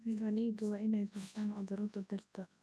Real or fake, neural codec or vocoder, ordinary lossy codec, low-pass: fake; codec, 24 kHz, 0.9 kbps, WavTokenizer, large speech release; none; none